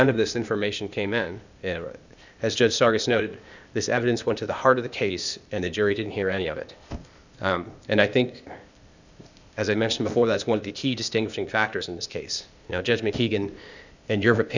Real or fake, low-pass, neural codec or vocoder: fake; 7.2 kHz; codec, 16 kHz, 0.8 kbps, ZipCodec